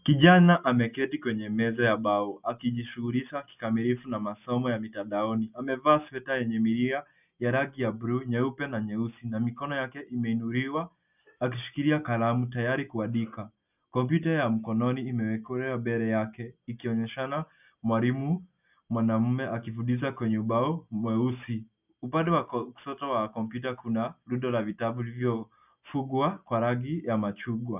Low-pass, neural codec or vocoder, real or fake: 3.6 kHz; none; real